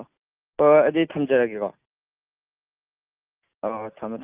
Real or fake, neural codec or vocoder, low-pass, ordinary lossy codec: real; none; 3.6 kHz; Opus, 24 kbps